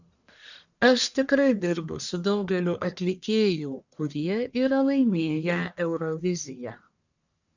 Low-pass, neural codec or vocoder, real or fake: 7.2 kHz; codec, 44.1 kHz, 1.7 kbps, Pupu-Codec; fake